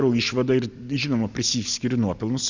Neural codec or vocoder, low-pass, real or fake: none; 7.2 kHz; real